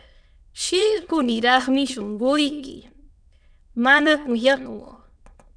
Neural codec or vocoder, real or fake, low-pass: autoencoder, 22.05 kHz, a latent of 192 numbers a frame, VITS, trained on many speakers; fake; 9.9 kHz